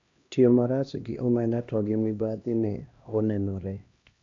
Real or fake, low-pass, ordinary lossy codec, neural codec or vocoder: fake; 7.2 kHz; AAC, 48 kbps; codec, 16 kHz, 1 kbps, X-Codec, HuBERT features, trained on LibriSpeech